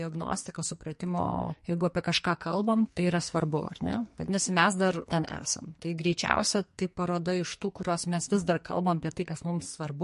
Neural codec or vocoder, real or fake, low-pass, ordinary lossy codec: codec, 44.1 kHz, 2.6 kbps, SNAC; fake; 14.4 kHz; MP3, 48 kbps